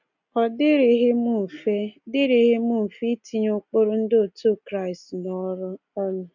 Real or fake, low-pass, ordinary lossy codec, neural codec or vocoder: real; 7.2 kHz; none; none